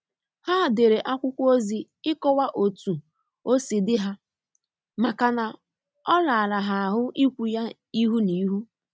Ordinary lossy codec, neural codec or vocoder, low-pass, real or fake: none; none; none; real